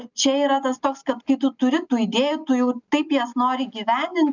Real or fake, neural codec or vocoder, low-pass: real; none; 7.2 kHz